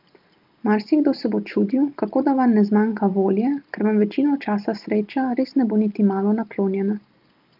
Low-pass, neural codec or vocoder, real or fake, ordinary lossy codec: 5.4 kHz; none; real; Opus, 32 kbps